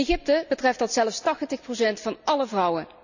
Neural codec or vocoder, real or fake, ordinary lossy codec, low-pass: none; real; none; 7.2 kHz